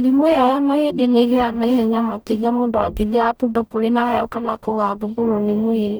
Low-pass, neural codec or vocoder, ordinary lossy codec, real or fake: none; codec, 44.1 kHz, 0.9 kbps, DAC; none; fake